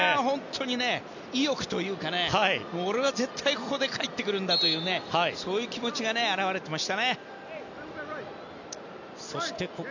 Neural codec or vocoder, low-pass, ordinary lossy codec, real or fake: none; 7.2 kHz; none; real